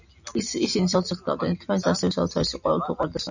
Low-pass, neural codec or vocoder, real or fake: 7.2 kHz; none; real